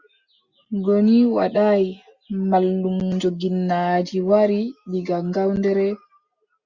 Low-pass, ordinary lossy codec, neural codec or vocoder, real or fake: 7.2 kHz; Opus, 64 kbps; none; real